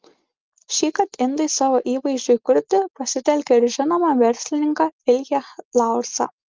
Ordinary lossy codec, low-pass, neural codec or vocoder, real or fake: Opus, 24 kbps; 7.2 kHz; none; real